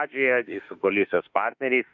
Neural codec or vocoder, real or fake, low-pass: autoencoder, 48 kHz, 32 numbers a frame, DAC-VAE, trained on Japanese speech; fake; 7.2 kHz